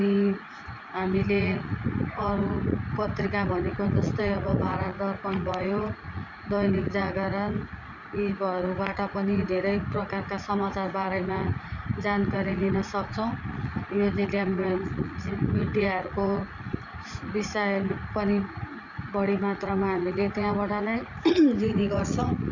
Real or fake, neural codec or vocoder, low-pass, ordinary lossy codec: fake; vocoder, 44.1 kHz, 80 mel bands, Vocos; 7.2 kHz; none